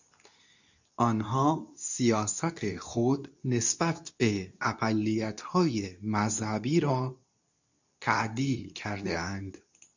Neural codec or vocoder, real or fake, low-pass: codec, 24 kHz, 0.9 kbps, WavTokenizer, medium speech release version 2; fake; 7.2 kHz